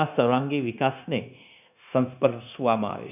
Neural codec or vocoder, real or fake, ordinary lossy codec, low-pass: codec, 16 kHz, 0.9 kbps, LongCat-Audio-Codec; fake; none; 3.6 kHz